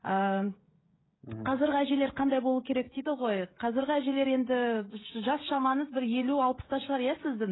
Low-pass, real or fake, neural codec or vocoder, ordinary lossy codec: 7.2 kHz; fake; codec, 16 kHz, 16 kbps, FreqCodec, smaller model; AAC, 16 kbps